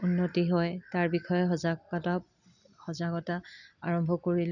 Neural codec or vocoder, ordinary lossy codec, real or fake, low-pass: none; none; real; 7.2 kHz